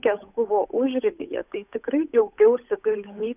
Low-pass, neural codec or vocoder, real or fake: 3.6 kHz; codec, 16 kHz, 8 kbps, FunCodec, trained on Chinese and English, 25 frames a second; fake